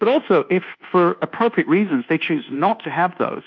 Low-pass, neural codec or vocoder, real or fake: 7.2 kHz; codec, 24 kHz, 1.2 kbps, DualCodec; fake